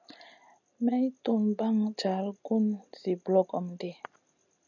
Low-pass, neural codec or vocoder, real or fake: 7.2 kHz; none; real